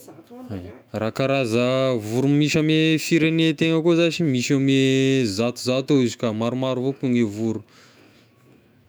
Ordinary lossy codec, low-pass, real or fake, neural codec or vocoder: none; none; fake; autoencoder, 48 kHz, 128 numbers a frame, DAC-VAE, trained on Japanese speech